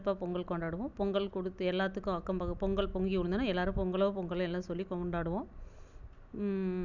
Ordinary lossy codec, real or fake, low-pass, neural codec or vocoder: none; real; 7.2 kHz; none